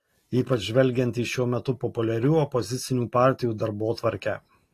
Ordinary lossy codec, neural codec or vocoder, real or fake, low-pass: AAC, 48 kbps; none; real; 14.4 kHz